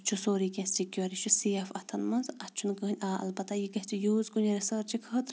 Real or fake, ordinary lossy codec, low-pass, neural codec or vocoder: real; none; none; none